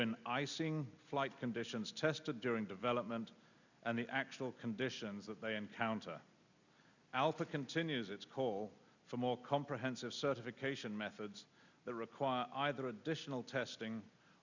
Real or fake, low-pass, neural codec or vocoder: real; 7.2 kHz; none